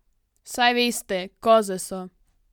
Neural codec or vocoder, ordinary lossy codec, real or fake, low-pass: none; none; real; 19.8 kHz